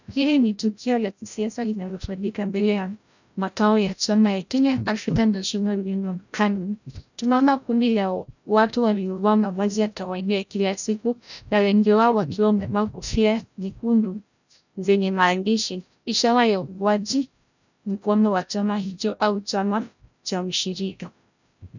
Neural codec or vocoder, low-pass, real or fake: codec, 16 kHz, 0.5 kbps, FreqCodec, larger model; 7.2 kHz; fake